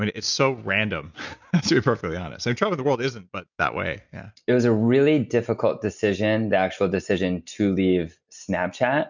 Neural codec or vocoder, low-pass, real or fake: none; 7.2 kHz; real